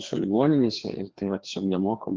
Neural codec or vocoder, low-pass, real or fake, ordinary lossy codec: codec, 16 kHz, 2 kbps, FreqCodec, larger model; 7.2 kHz; fake; Opus, 16 kbps